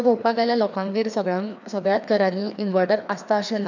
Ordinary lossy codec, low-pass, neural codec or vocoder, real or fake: none; 7.2 kHz; codec, 16 kHz, 2 kbps, FreqCodec, larger model; fake